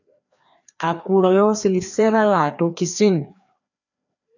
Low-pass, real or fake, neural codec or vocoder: 7.2 kHz; fake; codec, 16 kHz, 2 kbps, FreqCodec, larger model